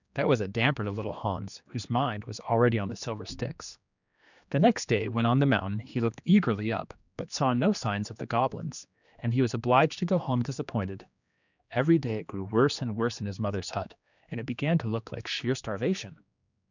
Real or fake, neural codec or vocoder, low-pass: fake; codec, 16 kHz, 2 kbps, X-Codec, HuBERT features, trained on general audio; 7.2 kHz